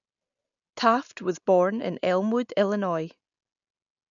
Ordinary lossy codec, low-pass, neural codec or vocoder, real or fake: none; 7.2 kHz; none; real